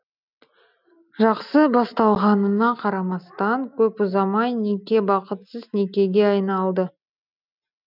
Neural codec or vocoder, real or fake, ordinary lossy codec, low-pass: none; real; none; 5.4 kHz